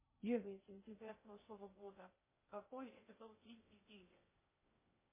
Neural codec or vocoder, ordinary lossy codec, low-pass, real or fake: codec, 16 kHz in and 24 kHz out, 0.6 kbps, FocalCodec, streaming, 2048 codes; MP3, 16 kbps; 3.6 kHz; fake